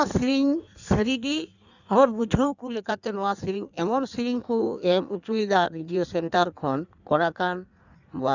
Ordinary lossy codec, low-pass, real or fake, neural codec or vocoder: none; 7.2 kHz; fake; codec, 16 kHz in and 24 kHz out, 1.1 kbps, FireRedTTS-2 codec